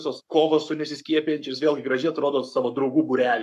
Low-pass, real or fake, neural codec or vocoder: 14.4 kHz; fake; codec, 44.1 kHz, 7.8 kbps, Pupu-Codec